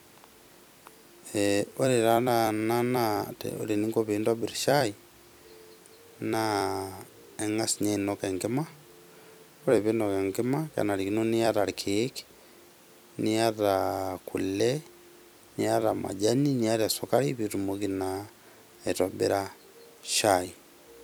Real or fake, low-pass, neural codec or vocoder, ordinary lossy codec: fake; none; vocoder, 44.1 kHz, 128 mel bands every 256 samples, BigVGAN v2; none